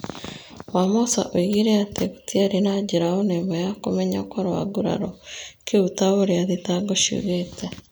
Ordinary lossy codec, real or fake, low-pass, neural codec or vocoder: none; real; none; none